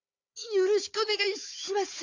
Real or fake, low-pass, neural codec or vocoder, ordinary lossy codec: fake; 7.2 kHz; codec, 16 kHz, 4 kbps, FunCodec, trained on Chinese and English, 50 frames a second; AAC, 48 kbps